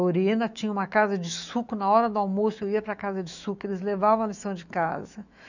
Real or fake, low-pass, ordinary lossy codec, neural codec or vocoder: fake; 7.2 kHz; none; autoencoder, 48 kHz, 128 numbers a frame, DAC-VAE, trained on Japanese speech